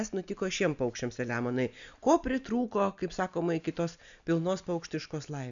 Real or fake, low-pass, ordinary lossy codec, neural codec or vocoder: real; 7.2 kHz; MP3, 96 kbps; none